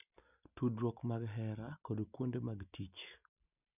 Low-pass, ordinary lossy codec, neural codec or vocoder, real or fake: 3.6 kHz; none; none; real